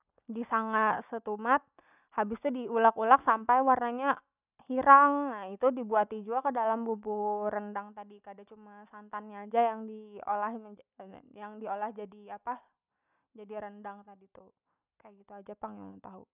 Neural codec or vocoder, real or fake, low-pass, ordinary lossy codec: none; real; 3.6 kHz; none